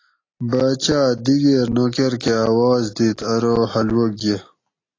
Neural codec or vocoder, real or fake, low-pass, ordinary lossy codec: none; real; 7.2 kHz; AAC, 32 kbps